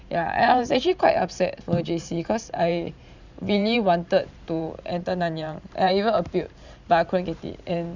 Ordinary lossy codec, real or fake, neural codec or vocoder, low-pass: none; fake; vocoder, 44.1 kHz, 128 mel bands every 512 samples, BigVGAN v2; 7.2 kHz